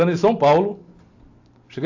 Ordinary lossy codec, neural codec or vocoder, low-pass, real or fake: AAC, 48 kbps; none; 7.2 kHz; real